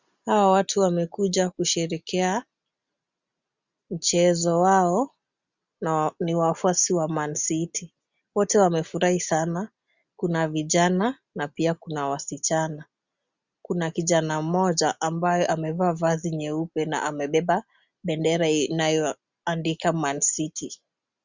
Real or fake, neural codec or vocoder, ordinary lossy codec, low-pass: real; none; Opus, 64 kbps; 7.2 kHz